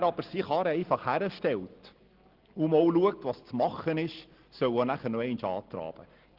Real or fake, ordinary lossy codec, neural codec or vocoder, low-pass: real; Opus, 16 kbps; none; 5.4 kHz